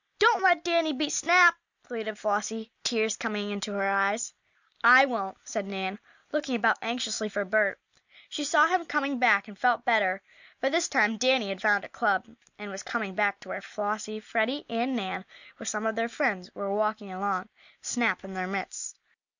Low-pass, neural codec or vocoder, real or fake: 7.2 kHz; none; real